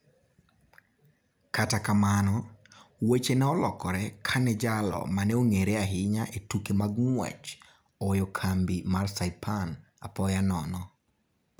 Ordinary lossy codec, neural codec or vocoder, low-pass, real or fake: none; none; none; real